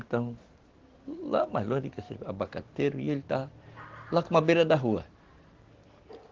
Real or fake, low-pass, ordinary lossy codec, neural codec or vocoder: real; 7.2 kHz; Opus, 16 kbps; none